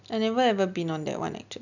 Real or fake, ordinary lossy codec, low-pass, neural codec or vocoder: real; none; 7.2 kHz; none